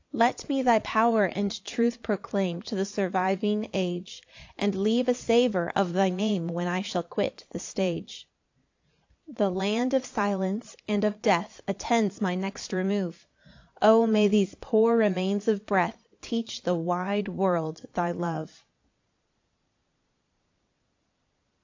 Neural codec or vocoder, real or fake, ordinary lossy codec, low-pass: vocoder, 22.05 kHz, 80 mel bands, Vocos; fake; AAC, 48 kbps; 7.2 kHz